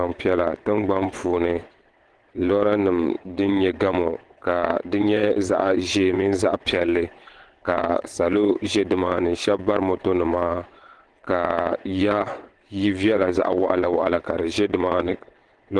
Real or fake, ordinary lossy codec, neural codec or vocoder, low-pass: fake; Opus, 16 kbps; vocoder, 22.05 kHz, 80 mel bands, WaveNeXt; 9.9 kHz